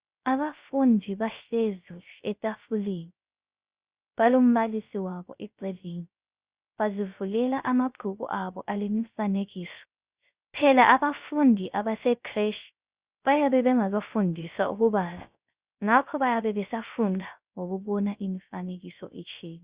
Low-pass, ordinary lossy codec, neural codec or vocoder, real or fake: 3.6 kHz; Opus, 64 kbps; codec, 16 kHz, 0.3 kbps, FocalCodec; fake